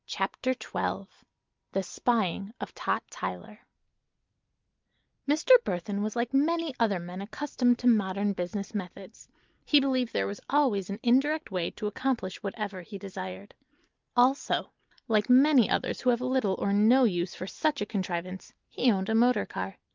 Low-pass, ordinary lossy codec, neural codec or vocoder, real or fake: 7.2 kHz; Opus, 32 kbps; none; real